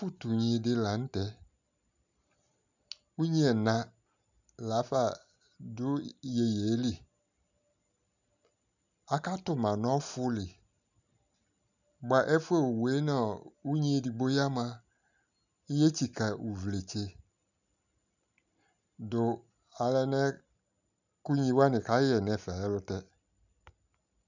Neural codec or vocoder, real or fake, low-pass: none; real; 7.2 kHz